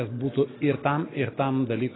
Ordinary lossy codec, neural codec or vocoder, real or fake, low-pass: AAC, 16 kbps; none; real; 7.2 kHz